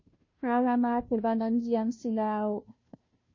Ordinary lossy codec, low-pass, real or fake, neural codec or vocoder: MP3, 32 kbps; 7.2 kHz; fake; codec, 16 kHz, 0.5 kbps, FunCodec, trained on Chinese and English, 25 frames a second